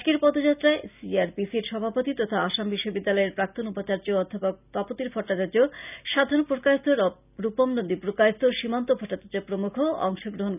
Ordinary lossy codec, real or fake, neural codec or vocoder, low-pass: none; real; none; 3.6 kHz